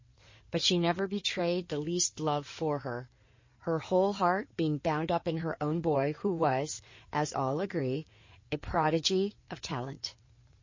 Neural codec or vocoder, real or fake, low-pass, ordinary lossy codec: codec, 16 kHz in and 24 kHz out, 2.2 kbps, FireRedTTS-2 codec; fake; 7.2 kHz; MP3, 32 kbps